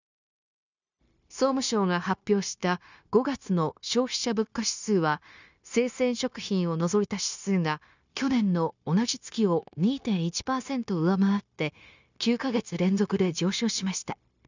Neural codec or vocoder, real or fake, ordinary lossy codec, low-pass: codec, 16 kHz, 0.9 kbps, LongCat-Audio-Codec; fake; none; 7.2 kHz